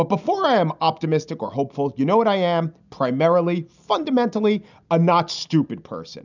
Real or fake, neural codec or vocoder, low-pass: real; none; 7.2 kHz